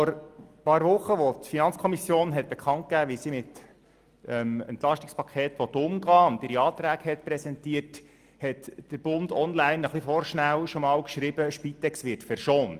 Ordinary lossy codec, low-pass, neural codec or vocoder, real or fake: Opus, 32 kbps; 14.4 kHz; none; real